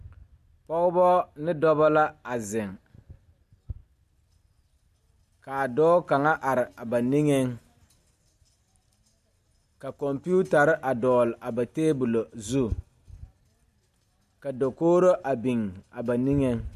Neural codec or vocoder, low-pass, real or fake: none; 14.4 kHz; real